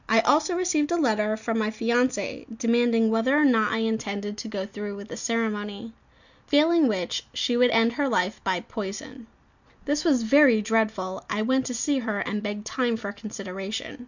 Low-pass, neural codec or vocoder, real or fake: 7.2 kHz; none; real